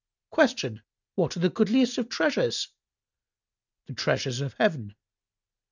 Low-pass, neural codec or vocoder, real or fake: 7.2 kHz; none; real